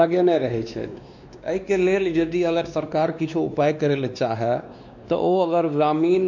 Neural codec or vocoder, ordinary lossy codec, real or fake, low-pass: codec, 16 kHz, 2 kbps, X-Codec, WavLM features, trained on Multilingual LibriSpeech; none; fake; 7.2 kHz